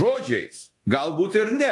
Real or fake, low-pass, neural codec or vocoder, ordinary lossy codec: fake; 10.8 kHz; codec, 24 kHz, 0.9 kbps, DualCodec; AAC, 48 kbps